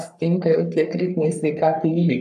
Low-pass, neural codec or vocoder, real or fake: 14.4 kHz; codec, 44.1 kHz, 3.4 kbps, Pupu-Codec; fake